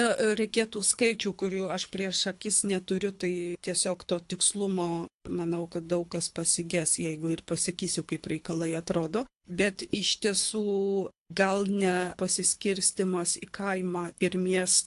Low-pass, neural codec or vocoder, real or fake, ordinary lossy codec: 10.8 kHz; codec, 24 kHz, 3 kbps, HILCodec; fake; AAC, 64 kbps